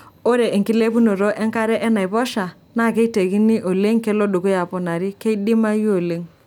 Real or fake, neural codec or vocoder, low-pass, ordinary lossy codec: real; none; 19.8 kHz; none